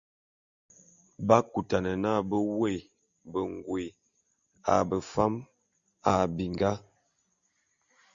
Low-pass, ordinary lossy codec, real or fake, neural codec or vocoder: 7.2 kHz; Opus, 64 kbps; real; none